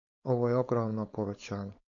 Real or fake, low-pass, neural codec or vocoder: fake; 7.2 kHz; codec, 16 kHz, 4.8 kbps, FACodec